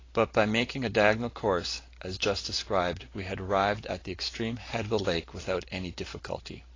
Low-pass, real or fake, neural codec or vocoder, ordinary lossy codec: 7.2 kHz; real; none; AAC, 32 kbps